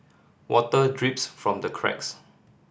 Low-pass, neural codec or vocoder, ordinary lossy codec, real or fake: none; none; none; real